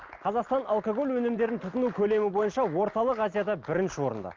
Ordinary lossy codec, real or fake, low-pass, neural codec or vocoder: Opus, 24 kbps; real; 7.2 kHz; none